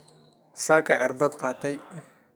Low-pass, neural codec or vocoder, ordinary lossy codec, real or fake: none; codec, 44.1 kHz, 2.6 kbps, SNAC; none; fake